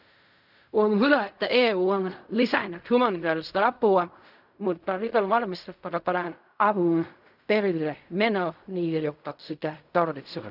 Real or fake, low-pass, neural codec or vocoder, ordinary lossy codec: fake; 5.4 kHz; codec, 16 kHz in and 24 kHz out, 0.4 kbps, LongCat-Audio-Codec, fine tuned four codebook decoder; none